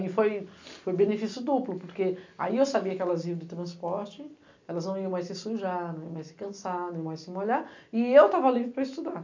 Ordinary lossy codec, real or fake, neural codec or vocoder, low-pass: MP3, 64 kbps; real; none; 7.2 kHz